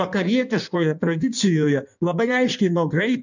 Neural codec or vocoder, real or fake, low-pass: codec, 16 kHz in and 24 kHz out, 1.1 kbps, FireRedTTS-2 codec; fake; 7.2 kHz